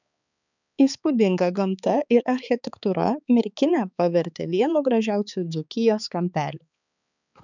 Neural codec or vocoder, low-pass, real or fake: codec, 16 kHz, 4 kbps, X-Codec, HuBERT features, trained on balanced general audio; 7.2 kHz; fake